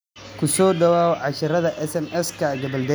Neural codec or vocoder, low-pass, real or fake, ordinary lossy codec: none; none; real; none